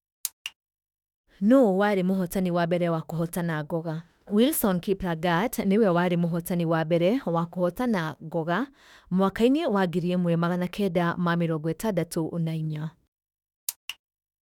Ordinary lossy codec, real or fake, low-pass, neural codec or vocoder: none; fake; 19.8 kHz; autoencoder, 48 kHz, 32 numbers a frame, DAC-VAE, trained on Japanese speech